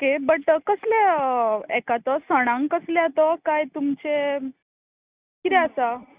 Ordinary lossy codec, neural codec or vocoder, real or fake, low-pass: Opus, 64 kbps; none; real; 3.6 kHz